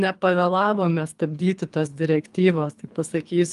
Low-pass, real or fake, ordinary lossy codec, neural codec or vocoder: 10.8 kHz; fake; Opus, 32 kbps; codec, 24 kHz, 3 kbps, HILCodec